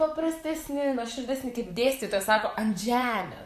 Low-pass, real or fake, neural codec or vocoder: 14.4 kHz; fake; vocoder, 44.1 kHz, 128 mel bands, Pupu-Vocoder